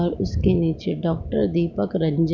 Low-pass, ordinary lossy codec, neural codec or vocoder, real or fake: 7.2 kHz; none; none; real